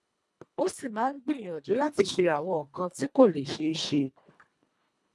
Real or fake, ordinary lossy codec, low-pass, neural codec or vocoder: fake; none; none; codec, 24 kHz, 1.5 kbps, HILCodec